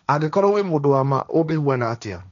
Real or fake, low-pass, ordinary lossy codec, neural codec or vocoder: fake; 7.2 kHz; none; codec, 16 kHz, 1.1 kbps, Voila-Tokenizer